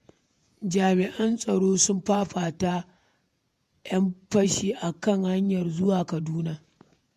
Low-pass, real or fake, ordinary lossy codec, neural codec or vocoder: 14.4 kHz; fake; MP3, 64 kbps; vocoder, 48 kHz, 128 mel bands, Vocos